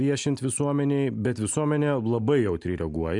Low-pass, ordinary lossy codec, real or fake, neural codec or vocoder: 10.8 kHz; MP3, 96 kbps; real; none